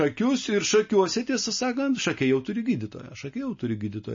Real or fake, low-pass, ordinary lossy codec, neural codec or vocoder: real; 7.2 kHz; MP3, 32 kbps; none